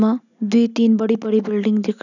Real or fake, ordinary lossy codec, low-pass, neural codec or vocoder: real; none; 7.2 kHz; none